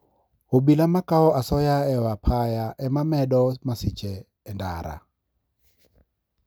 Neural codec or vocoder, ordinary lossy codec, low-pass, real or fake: none; none; none; real